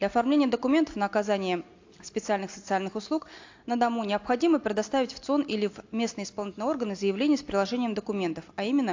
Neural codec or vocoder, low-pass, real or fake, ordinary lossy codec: none; 7.2 kHz; real; AAC, 48 kbps